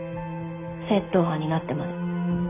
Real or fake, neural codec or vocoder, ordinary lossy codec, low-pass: real; none; none; 3.6 kHz